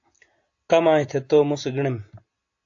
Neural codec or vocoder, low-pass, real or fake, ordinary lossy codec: none; 7.2 kHz; real; AAC, 48 kbps